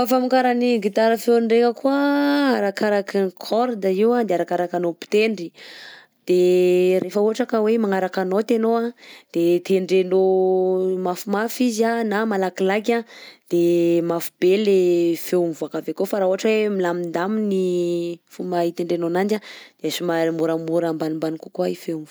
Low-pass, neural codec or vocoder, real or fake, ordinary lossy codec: none; none; real; none